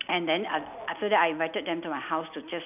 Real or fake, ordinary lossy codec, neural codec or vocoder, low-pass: real; none; none; 3.6 kHz